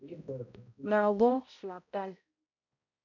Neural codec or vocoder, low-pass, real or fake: codec, 16 kHz, 0.5 kbps, X-Codec, HuBERT features, trained on balanced general audio; 7.2 kHz; fake